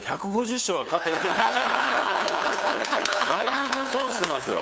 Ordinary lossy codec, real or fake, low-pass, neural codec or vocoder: none; fake; none; codec, 16 kHz, 2 kbps, FunCodec, trained on LibriTTS, 25 frames a second